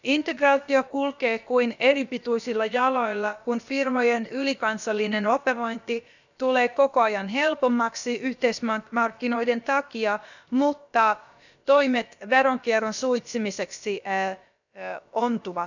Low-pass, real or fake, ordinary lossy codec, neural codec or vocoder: 7.2 kHz; fake; none; codec, 16 kHz, about 1 kbps, DyCAST, with the encoder's durations